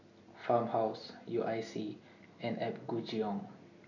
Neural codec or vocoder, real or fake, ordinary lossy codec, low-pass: none; real; none; 7.2 kHz